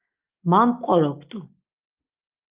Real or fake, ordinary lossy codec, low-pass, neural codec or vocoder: real; Opus, 32 kbps; 3.6 kHz; none